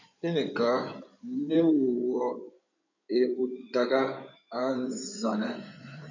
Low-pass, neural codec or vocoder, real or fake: 7.2 kHz; codec, 16 kHz in and 24 kHz out, 2.2 kbps, FireRedTTS-2 codec; fake